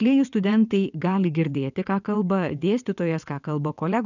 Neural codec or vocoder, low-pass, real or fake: vocoder, 22.05 kHz, 80 mel bands, WaveNeXt; 7.2 kHz; fake